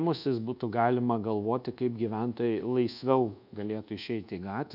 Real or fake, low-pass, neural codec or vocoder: fake; 5.4 kHz; codec, 24 kHz, 1.2 kbps, DualCodec